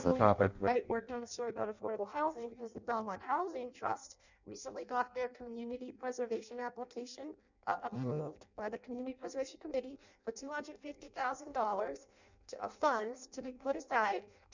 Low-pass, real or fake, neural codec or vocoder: 7.2 kHz; fake; codec, 16 kHz in and 24 kHz out, 0.6 kbps, FireRedTTS-2 codec